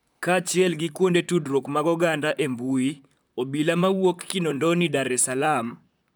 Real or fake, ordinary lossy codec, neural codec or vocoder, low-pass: fake; none; vocoder, 44.1 kHz, 128 mel bands, Pupu-Vocoder; none